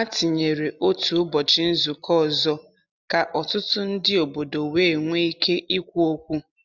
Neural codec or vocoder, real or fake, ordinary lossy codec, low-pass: none; real; none; 7.2 kHz